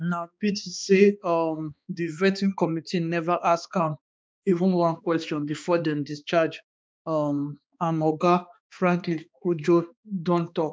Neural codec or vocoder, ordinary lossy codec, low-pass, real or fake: codec, 16 kHz, 2 kbps, X-Codec, HuBERT features, trained on balanced general audio; none; none; fake